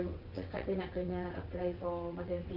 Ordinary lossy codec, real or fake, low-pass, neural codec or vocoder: none; fake; 5.4 kHz; vocoder, 22.05 kHz, 80 mel bands, WaveNeXt